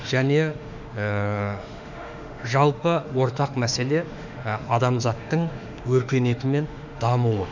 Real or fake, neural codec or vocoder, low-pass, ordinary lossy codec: fake; autoencoder, 48 kHz, 32 numbers a frame, DAC-VAE, trained on Japanese speech; 7.2 kHz; none